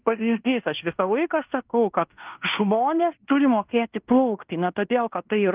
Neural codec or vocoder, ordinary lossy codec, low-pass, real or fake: codec, 16 kHz in and 24 kHz out, 0.9 kbps, LongCat-Audio-Codec, fine tuned four codebook decoder; Opus, 24 kbps; 3.6 kHz; fake